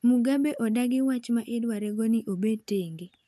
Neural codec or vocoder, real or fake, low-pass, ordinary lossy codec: none; real; 14.4 kHz; none